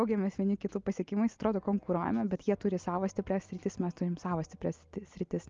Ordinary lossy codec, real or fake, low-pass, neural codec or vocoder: Opus, 32 kbps; real; 7.2 kHz; none